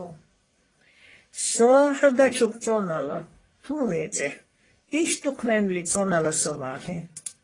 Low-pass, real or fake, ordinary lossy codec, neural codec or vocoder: 10.8 kHz; fake; AAC, 32 kbps; codec, 44.1 kHz, 1.7 kbps, Pupu-Codec